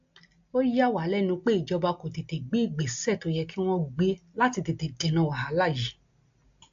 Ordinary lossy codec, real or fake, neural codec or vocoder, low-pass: AAC, 64 kbps; real; none; 7.2 kHz